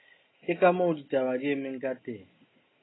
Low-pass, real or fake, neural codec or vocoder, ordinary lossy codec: 7.2 kHz; real; none; AAC, 16 kbps